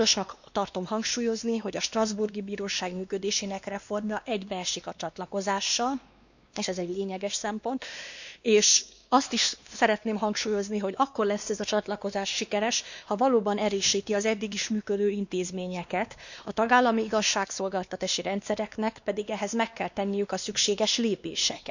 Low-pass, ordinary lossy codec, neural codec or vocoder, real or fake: 7.2 kHz; none; codec, 16 kHz, 2 kbps, X-Codec, WavLM features, trained on Multilingual LibriSpeech; fake